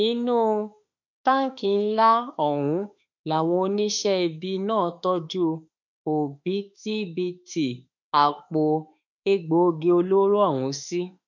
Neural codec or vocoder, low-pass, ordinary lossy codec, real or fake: autoencoder, 48 kHz, 32 numbers a frame, DAC-VAE, trained on Japanese speech; 7.2 kHz; none; fake